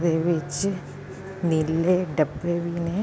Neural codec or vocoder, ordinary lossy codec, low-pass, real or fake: none; none; none; real